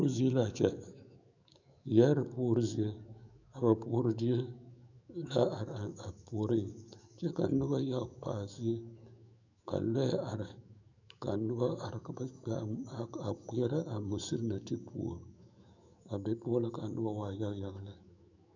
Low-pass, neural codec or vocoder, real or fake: 7.2 kHz; autoencoder, 48 kHz, 128 numbers a frame, DAC-VAE, trained on Japanese speech; fake